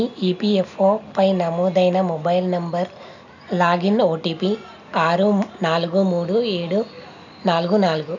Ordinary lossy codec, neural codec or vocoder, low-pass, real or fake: none; none; 7.2 kHz; real